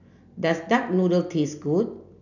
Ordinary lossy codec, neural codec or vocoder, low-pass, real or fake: none; none; 7.2 kHz; real